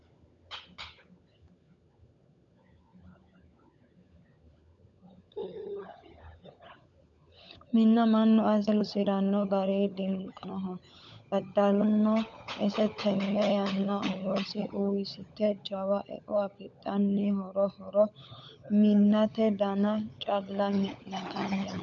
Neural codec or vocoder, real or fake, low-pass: codec, 16 kHz, 16 kbps, FunCodec, trained on LibriTTS, 50 frames a second; fake; 7.2 kHz